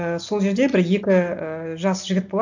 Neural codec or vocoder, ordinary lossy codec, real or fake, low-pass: none; none; real; 7.2 kHz